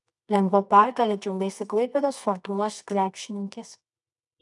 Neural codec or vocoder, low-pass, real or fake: codec, 24 kHz, 0.9 kbps, WavTokenizer, medium music audio release; 10.8 kHz; fake